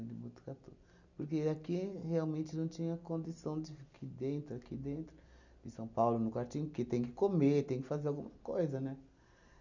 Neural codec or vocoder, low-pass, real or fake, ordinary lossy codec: none; 7.2 kHz; real; none